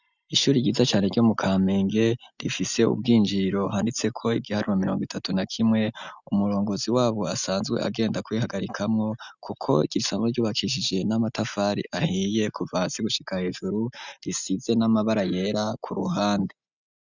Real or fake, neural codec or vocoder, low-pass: real; none; 7.2 kHz